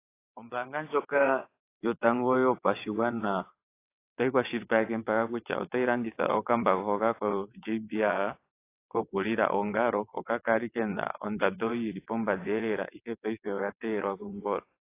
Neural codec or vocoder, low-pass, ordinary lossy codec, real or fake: vocoder, 22.05 kHz, 80 mel bands, WaveNeXt; 3.6 kHz; AAC, 24 kbps; fake